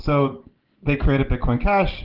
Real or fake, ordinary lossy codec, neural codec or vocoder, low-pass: real; Opus, 16 kbps; none; 5.4 kHz